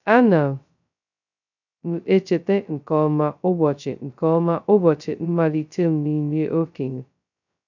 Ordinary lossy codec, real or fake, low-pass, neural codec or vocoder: none; fake; 7.2 kHz; codec, 16 kHz, 0.2 kbps, FocalCodec